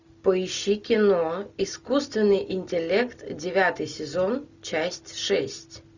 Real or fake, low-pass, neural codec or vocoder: real; 7.2 kHz; none